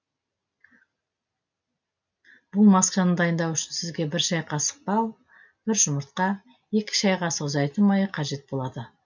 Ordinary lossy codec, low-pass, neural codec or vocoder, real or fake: none; 7.2 kHz; none; real